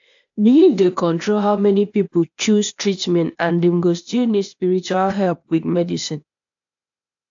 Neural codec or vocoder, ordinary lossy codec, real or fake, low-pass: codec, 16 kHz, 0.8 kbps, ZipCodec; AAC, 64 kbps; fake; 7.2 kHz